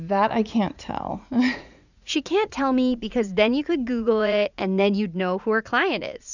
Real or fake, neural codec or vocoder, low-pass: fake; vocoder, 44.1 kHz, 80 mel bands, Vocos; 7.2 kHz